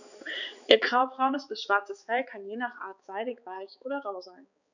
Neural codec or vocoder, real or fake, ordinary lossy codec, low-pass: codec, 16 kHz, 4 kbps, X-Codec, HuBERT features, trained on balanced general audio; fake; none; 7.2 kHz